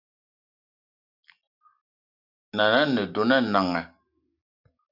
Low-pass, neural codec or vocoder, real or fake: 5.4 kHz; none; real